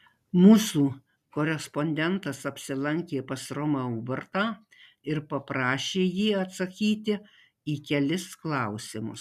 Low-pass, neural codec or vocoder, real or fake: 14.4 kHz; none; real